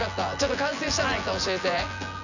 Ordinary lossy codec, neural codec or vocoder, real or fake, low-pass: none; vocoder, 24 kHz, 100 mel bands, Vocos; fake; 7.2 kHz